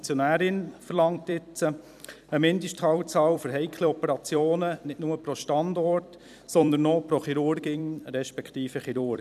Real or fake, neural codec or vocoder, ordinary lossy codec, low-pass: fake; vocoder, 44.1 kHz, 128 mel bands every 256 samples, BigVGAN v2; none; 14.4 kHz